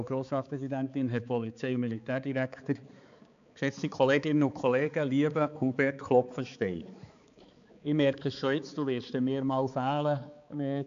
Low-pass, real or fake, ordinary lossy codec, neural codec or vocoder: 7.2 kHz; fake; MP3, 64 kbps; codec, 16 kHz, 4 kbps, X-Codec, HuBERT features, trained on balanced general audio